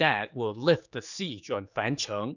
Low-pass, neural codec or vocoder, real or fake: 7.2 kHz; codec, 16 kHz, 4 kbps, X-Codec, HuBERT features, trained on general audio; fake